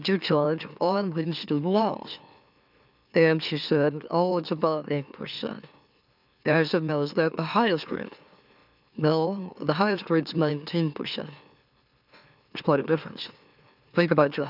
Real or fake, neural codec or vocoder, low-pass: fake; autoencoder, 44.1 kHz, a latent of 192 numbers a frame, MeloTTS; 5.4 kHz